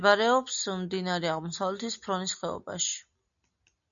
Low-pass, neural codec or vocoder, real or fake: 7.2 kHz; none; real